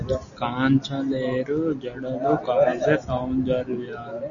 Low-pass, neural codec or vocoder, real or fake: 7.2 kHz; none; real